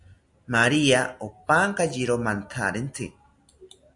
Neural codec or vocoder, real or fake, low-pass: none; real; 10.8 kHz